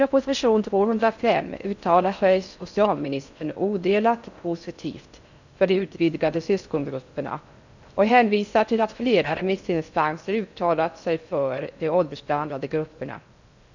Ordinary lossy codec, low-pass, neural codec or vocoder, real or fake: none; 7.2 kHz; codec, 16 kHz in and 24 kHz out, 0.6 kbps, FocalCodec, streaming, 4096 codes; fake